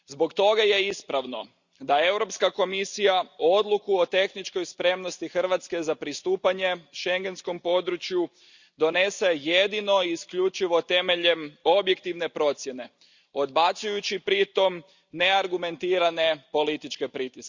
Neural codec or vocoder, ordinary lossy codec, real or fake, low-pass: none; Opus, 64 kbps; real; 7.2 kHz